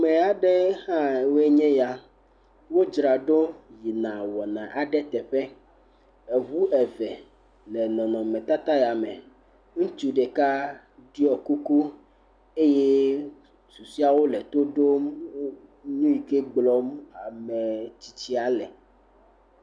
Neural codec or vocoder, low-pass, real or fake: none; 9.9 kHz; real